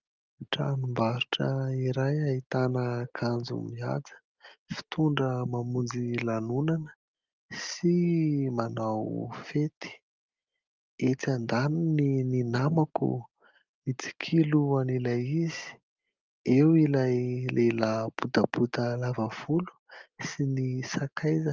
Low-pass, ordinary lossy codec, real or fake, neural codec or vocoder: 7.2 kHz; Opus, 32 kbps; real; none